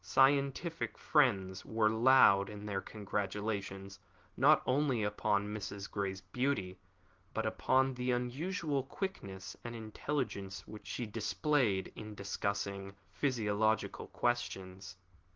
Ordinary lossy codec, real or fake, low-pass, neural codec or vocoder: Opus, 16 kbps; real; 7.2 kHz; none